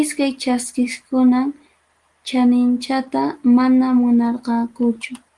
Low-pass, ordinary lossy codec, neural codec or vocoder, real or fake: 10.8 kHz; Opus, 24 kbps; none; real